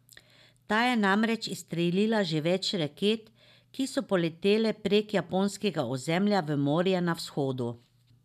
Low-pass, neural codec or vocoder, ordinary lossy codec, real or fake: 14.4 kHz; none; none; real